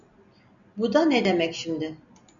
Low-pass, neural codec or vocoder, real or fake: 7.2 kHz; none; real